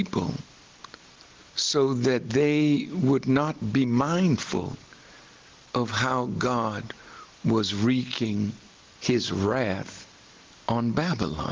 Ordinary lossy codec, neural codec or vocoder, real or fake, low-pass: Opus, 16 kbps; none; real; 7.2 kHz